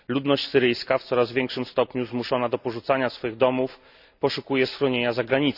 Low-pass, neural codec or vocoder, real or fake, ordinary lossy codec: 5.4 kHz; none; real; none